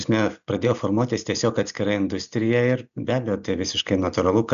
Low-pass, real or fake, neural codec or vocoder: 7.2 kHz; real; none